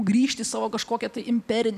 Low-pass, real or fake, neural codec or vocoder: 14.4 kHz; fake; vocoder, 44.1 kHz, 128 mel bands every 256 samples, BigVGAN v2